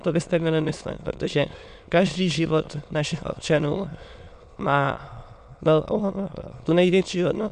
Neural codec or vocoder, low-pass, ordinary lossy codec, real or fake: autoencoder, 22.05 kHz, a latent of 192 numbers a frame, VITS, trained on many speakers; 9.9 kHz; MP3, 96 kbps; fake